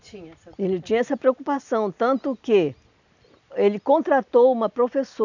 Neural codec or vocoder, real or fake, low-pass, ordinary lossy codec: none; real; 7.2 kHz; none